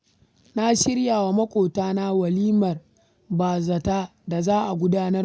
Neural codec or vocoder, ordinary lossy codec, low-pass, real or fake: none; none; none; real